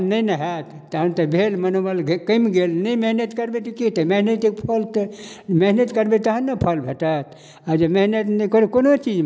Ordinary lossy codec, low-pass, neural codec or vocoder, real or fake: none; none; none; real